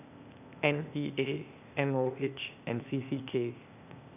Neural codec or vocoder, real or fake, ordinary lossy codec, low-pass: codec, 16 kHz, 0.8 kbps, ZipCodec; fake; none; 3.6 kHz